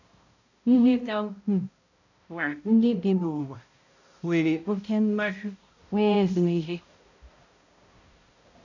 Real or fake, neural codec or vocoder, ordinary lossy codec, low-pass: fake; codec, 16 kHz, 0.5 kbps, X-Codec, HuBERT features, trained on balanced general audio; none; 7.2 kHz